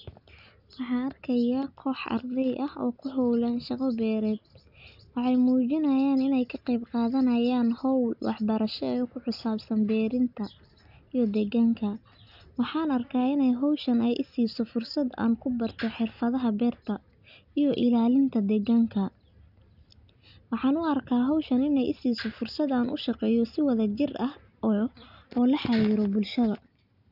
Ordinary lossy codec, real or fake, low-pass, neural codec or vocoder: none; real; 5.4 kHz; none